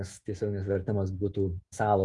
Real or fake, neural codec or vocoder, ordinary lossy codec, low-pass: fake; autoencoder, 48 kHz, 128 numbers a frame, DAC-VAE, trained on Japanese speech; Opus, 32 kbps; 10.8 kHz